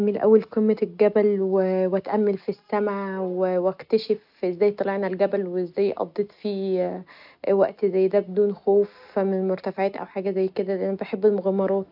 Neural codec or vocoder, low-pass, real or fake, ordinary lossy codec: none; 5.4 kHz; real; AAC, 48 kbps